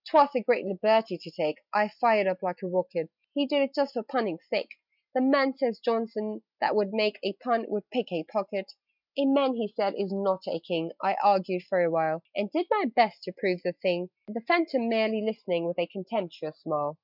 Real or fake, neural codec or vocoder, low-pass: real; none; 5.4 kHz